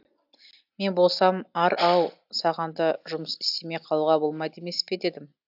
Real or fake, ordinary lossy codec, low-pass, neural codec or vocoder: real; AAC, 48 kbps; 5.4 kHz; none